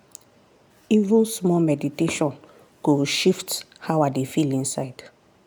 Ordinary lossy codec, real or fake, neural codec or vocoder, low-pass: none; real; none; none